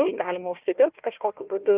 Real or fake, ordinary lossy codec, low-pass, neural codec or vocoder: fake; Opus, 24 kbps; 3.6 kHz; codec, 16 kHz, 1 kbps, FunCodec, trained on Chinese and English, 50 frames a second